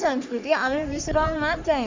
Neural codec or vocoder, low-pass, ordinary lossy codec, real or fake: codec, 44.1 kHz, 3.4 kbps, Pupu-Codec; 7.2 kHz; MP3, 64 kbps; fake